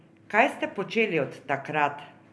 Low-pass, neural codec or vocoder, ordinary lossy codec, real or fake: none; none; none; real